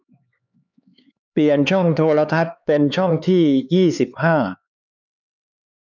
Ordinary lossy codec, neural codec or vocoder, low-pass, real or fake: none; codec, 16 kHz, 4 kbps, X-Codec, HuBERT features, trained on LibriSpeech; 7.2 kHz; fake